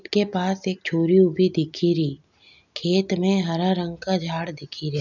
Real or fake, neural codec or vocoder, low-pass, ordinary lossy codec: real; none; 7.2 kHz; none